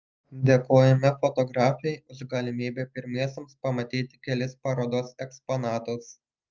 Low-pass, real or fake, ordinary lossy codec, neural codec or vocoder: 7.2 kHz; real; Opus, 24 kbps; none